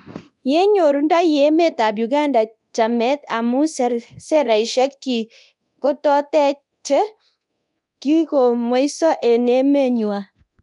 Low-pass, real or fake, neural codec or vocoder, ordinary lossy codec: 10.8 kHz; fake; codec, 24 kHz, 0.9 kbps, DualCodec; none